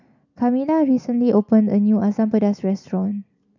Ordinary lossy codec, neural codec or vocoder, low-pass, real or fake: none; none; 7.2 kHz; real